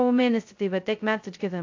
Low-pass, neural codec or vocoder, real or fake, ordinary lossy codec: 7.2 kHz; codec, 16 kHz, 0.2 kbps, FocalCodec; fake; AAC, 48 kbps